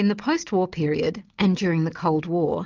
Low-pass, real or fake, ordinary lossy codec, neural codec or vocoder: 7.2 kHz; real; Opus, 24 kbps; none